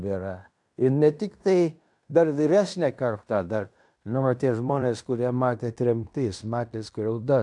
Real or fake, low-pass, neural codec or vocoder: fake; 10.8 kHz; codec, 16 kHz in and 24 kHz out, 0.9 kbps, LongCat-Audio-Codec, fine tuned four codebook decoder